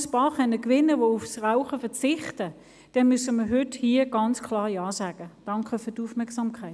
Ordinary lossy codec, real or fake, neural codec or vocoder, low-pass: none; real; none; none